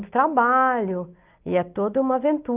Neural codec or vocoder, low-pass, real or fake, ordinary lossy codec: none; 3.6 kHz; real; Opus, 32 kbps